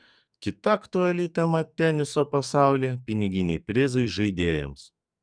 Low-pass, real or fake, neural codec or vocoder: 9.9 kHz; fake; codec, 44.1 kHz, 2.6 kbps, SNAC